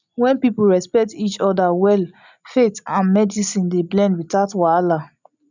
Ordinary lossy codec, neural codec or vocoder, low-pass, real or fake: none; none; 7.2 kHz; real